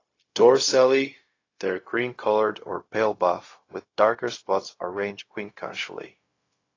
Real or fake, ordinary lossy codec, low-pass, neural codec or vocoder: fake; AAC, 32 kbps; 7.2 kHz; codec, 16 kHz, 0.4 kbps, LongCat-Audio-Codec